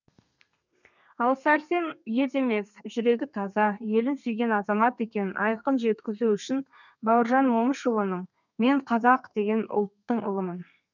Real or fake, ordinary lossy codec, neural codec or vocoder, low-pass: fake; none; codec, 32 kHz, 1.9 kbps, SNAC; 7.2 kHz